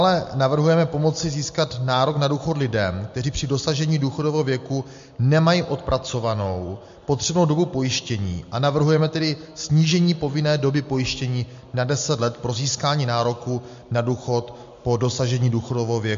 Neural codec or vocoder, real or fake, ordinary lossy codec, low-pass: none; real; MP3, 48 kbps; 7.2 kHz